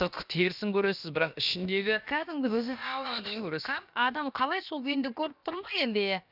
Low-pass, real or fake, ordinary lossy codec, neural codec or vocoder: 5.4 kHz; fake; none; codec, 16 kHz, about 1 kbps, DyCAST, with the encoder's durations